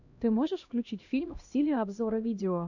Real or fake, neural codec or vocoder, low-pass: fake; codec, 16 kHz, 1 kbps, X-Codec, HuBERT features, trained on LibriSpeech; 7.2 kHz